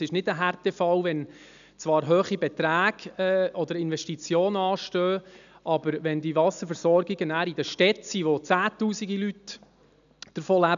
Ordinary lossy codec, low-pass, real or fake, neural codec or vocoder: none; 7.2 kHz; real; none